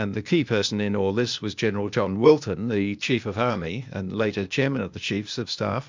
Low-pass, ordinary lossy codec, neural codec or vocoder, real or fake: 7.2 kHz; MP3, 64 kbps; codec, 16 kHz, 0.8 kbps, ZipCodec; fake